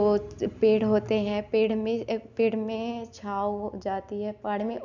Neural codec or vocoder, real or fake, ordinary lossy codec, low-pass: none; real; none; 7.2 kHz